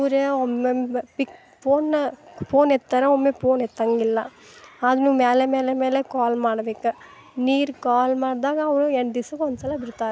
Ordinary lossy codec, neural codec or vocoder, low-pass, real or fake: none; none; none; real